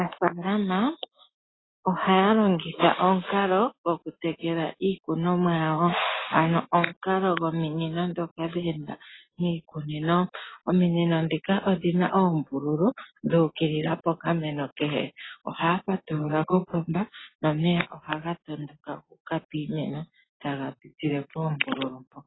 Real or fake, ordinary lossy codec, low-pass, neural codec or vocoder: real; AAC, 16 kbps; 7.2 kHz; none